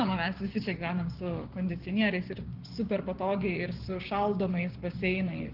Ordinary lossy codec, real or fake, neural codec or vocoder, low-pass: Opus, 16 kbps; fake; codec, 16 kHz, 6 kbps, DAC; 5.4 kHz